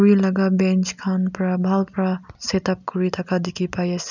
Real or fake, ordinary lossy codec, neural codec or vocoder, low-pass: real; none; none; 7.2 kHz